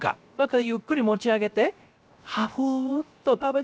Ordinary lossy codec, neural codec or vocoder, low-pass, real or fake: none; codec, 16 kHz, 0.7 kbps, FocalCodec; none; fake